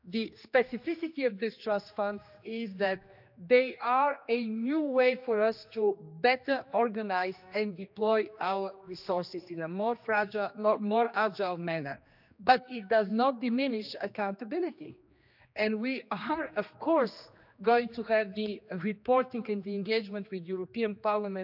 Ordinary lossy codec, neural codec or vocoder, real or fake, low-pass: none; codec, 16 kHz, 2 kbps, X-Codec, HuBERT features, trained on general audio; fake; 5.4 kHz